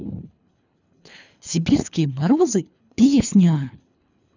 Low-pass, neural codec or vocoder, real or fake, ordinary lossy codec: 7.2 kHz; codec, 24 kHz, 3 kbps, HILCodec; fake; none